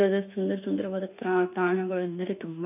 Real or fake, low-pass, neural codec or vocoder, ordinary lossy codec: fake; 3.6 kHz; autoencoder, 48 kHz, 32 numbers a frame, DAC-VAE, trained on Japanese speech; none